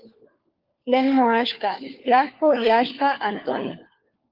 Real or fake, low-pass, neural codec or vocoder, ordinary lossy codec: fake; 5.4 kHz; codec, 16 kHz, 4 kbps, FunCodec, trained on LibriTTS, 50 frames a second; Opus, 32 kbps